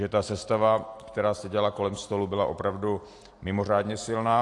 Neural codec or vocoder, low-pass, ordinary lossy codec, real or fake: none; 10.8 kHz; AAC, 48 kbps; real